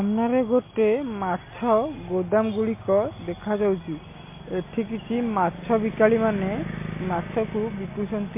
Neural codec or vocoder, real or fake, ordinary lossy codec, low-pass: none; real; MP3, 24 kbps; 3.6 kHz